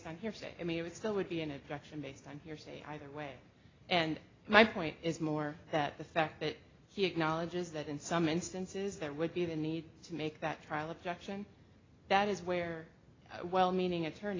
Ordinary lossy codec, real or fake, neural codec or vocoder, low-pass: AAC, 32 kbps; real; none; 7.2 kHz